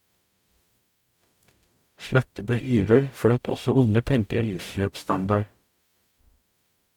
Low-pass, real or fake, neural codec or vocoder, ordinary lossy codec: 19.8 kHz; fake; codec, 44.1 kHz, 0.9 kbps, DAC; none